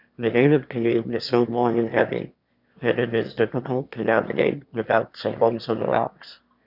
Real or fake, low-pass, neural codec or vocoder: fake; 5.4 kHz; autoencoder, 22.05 kHz, a latent of 192 numbers a frame, VITS, trained on one speaker